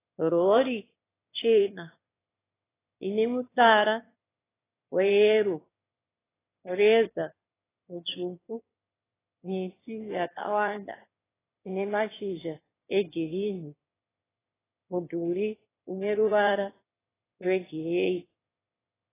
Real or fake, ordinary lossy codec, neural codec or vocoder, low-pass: fake; AAC, 16 kbps; autoencoder, 22.05 kHz, a latent of 192 numbers a frame, VITS, trained on one speaker; 3.6 kHz